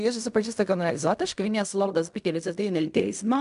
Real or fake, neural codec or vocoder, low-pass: fake; codec, 16 kHz in and 24 kHz out, 0.4 kbps, LongCat-Audio-Codec, fine tuned four codebook decoder; 10.8 kHz